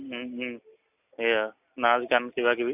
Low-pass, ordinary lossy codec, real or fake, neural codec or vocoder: 3.6 kHz; none; real; none